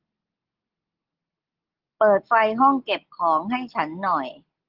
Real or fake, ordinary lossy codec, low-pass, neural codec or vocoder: real; Opus, 16 kbps; 5.4 kHz; none